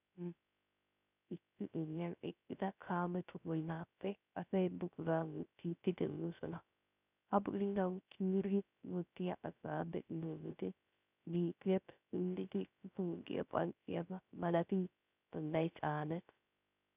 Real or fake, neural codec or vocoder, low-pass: fake; codec, 16 kHz, 0.3 kbps, FocalCodec; 3.6 kHz